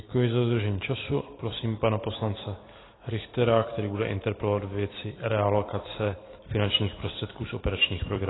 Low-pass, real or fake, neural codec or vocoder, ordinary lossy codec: 7.2 kHz; fake; vocoder, 44.1 kHz, 128 mel bands every 256 samples, BigVGAN v2; AAC, 16 kbps